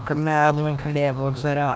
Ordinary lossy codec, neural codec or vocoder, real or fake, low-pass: none; codec, 16 kHz, 1 kbps, FreqCodec, larger model; fake; none